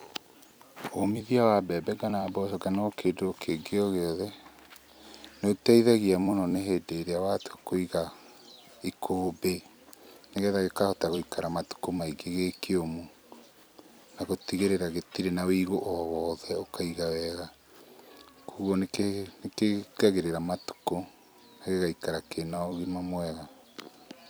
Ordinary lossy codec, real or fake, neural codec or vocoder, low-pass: none; fake; vocoder, 44.1 kHz, 128 mel bands every 256 samples, BigVGAN v2; none